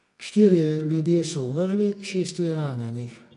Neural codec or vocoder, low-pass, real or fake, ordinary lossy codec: codec, 24 kHz, 0.9 kbps, WavTokenizer, medium music audio release; 10.8 kHz; fake; none